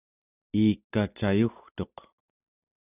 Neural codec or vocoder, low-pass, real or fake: none; 3.6 kHz; real